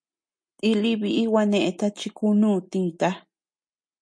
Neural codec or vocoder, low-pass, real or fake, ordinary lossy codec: none; 9.9 kHz; real; MP3, 48 kbps